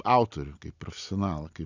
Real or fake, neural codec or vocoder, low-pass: real; none; 7.2 kHz